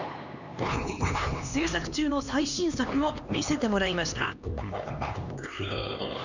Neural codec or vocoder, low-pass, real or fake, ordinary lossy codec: codec, 16 kHz, 2 kbps, X-Codec, HuBERT features, trained on LibriSpeech; 7.2 kHz; fake; none